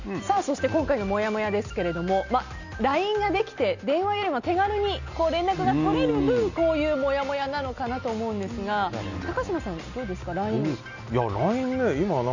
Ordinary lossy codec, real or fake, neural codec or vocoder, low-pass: none; real; none; 7.2 kHz